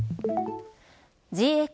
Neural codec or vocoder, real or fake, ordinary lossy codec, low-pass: none; real; none; none